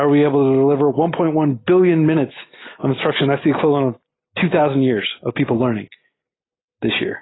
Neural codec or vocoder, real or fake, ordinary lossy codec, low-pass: none; real; AAC, 16 kbps; 7.2 kHz